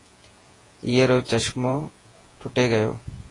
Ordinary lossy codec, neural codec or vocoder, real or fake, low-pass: AAC, 32 kbps; vocoder, 48 kHz, 128 mel bands, Vocos; fake; 10.8 kHz